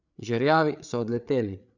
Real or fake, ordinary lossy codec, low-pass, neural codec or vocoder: fake; none; 7.2 kHz; codec, 16 kHz, 8 kbps, FreqCodec, larger model